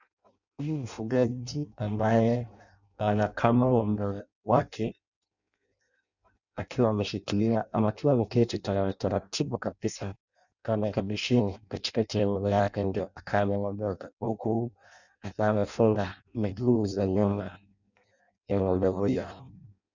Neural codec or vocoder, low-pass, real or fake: codec, 16 kHz in and 24 kHz out, 0.6 kbps, FireRedTTS-2 codec; 7.2 kHz; fake